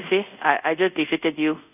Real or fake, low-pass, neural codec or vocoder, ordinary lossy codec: fake; 3.6 kHz; codec, 24 kHz, 0.5 kbps, DualCodec; none